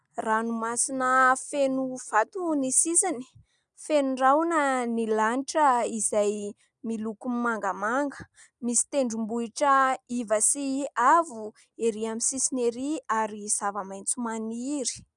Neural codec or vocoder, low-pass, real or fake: none; 10.8 kHz; real